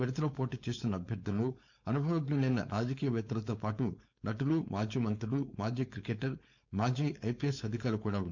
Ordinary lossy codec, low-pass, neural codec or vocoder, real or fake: none; 7.2 kHz; codec, 16 kHz, 4.8 kbps, FACodec; fake